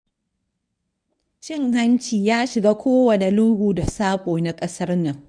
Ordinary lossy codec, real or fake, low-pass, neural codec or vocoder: none; fake; 9.9 kHz; codec, 24 kHz, 0.9 kbps, WavTokenizer, medium speech release version 1